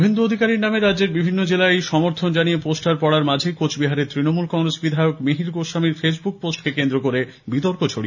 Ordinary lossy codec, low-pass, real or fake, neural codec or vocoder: none; 7.2 kHz; real; none